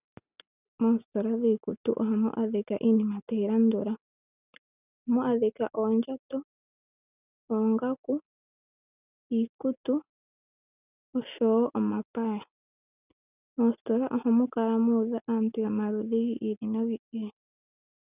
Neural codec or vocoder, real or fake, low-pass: none; real; 3.6 kHz